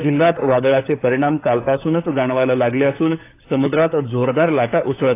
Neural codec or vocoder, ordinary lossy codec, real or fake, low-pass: codec, 16 kHz, 4 kbps, FunCodec, trained on LibriTTS, 50 frames a second; AAC, 24 kbps; fake; 3.6 kHz